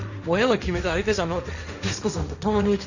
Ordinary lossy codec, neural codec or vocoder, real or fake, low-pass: none; codec, 16 kHz, 1.1 kbps, Voila-Tokenizer; fake; 7.2 kHz